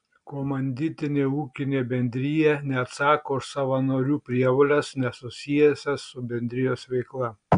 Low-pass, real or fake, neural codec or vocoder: 9.9 kHz; real; none